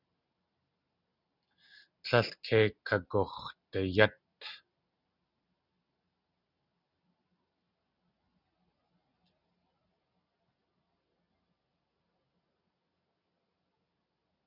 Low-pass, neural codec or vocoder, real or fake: 5.4 kHz; none; real